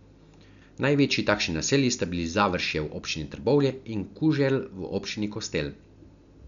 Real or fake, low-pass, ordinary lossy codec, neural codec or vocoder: real; 7.2 kHz; none; none